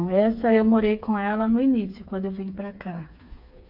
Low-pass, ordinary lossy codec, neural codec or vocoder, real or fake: 5.4 kHz; MP3, 48 kbps; codec, 16 kHz, 4 kbps, FreqCodec, smaller model; fake